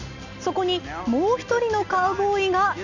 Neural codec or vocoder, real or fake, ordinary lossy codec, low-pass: none; real; none; 7.2 kHz